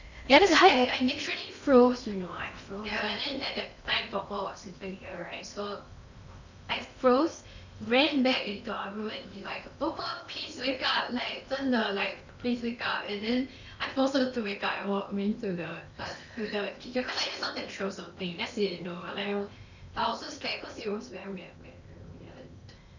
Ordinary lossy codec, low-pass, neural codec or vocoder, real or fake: none; 7.2 kHz; codec, 16 kHz in and 24 kHz out, 0.8 kbps, FocalCodec, streaming, 65536 codes; fake